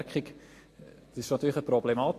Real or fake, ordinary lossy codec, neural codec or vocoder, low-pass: real; AAC, 48 kbps; none; 14.4 kHz